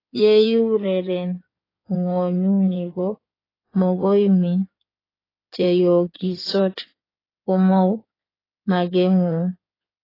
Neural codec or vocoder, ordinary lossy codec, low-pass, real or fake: codec, 16 kHz in and 24 kHz out, 2.2 kbps, FireRedTTS-2 codec; AAC, 24 kbps; 5.4 kHz; fake